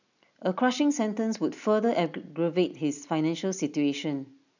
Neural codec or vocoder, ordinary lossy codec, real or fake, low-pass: none; none; real; 7.2 kHz